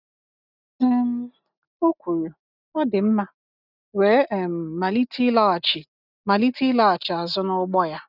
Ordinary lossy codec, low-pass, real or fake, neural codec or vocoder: none; 5.4 kHz; real; none